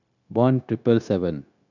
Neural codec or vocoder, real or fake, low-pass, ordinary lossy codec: codec, 16 kHz, 0.9 kbps, LongCat-Audio-Codec; fake; 7.2 kHz; none